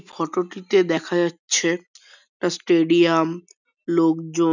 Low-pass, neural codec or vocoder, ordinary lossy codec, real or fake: 7.2 kHz; none; none; real